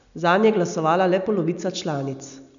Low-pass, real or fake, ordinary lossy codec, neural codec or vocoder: 7.2 kHz; real; none; none